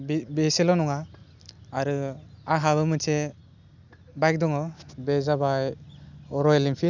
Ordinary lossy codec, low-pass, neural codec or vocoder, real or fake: none; 7.2 kHz; none; real